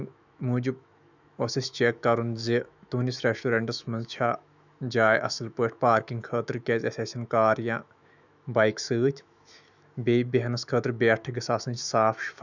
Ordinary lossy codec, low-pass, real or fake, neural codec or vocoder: none; 7.2 kHz; fake; autoencoder, 48 kHz, 128 numbers a frame, DAC-VAE, trained on Japanese speech